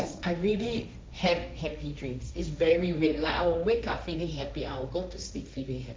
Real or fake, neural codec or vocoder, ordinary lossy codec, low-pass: fake; codec, 16 kHz, 1.1 kbps, Voila-Tokenizer; none; none